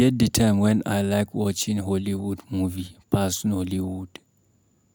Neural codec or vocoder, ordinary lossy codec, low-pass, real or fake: none; none; none; real